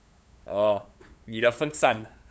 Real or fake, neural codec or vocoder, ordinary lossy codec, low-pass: fake; codec, 16 kHz, 8 kbps, FunCodec, trained on LibriTTS, 25 frames a second; none; none